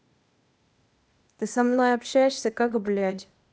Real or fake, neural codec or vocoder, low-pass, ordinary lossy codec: fake; codec, 16 kHz, 0.8 kbps, ZipCodec; none; none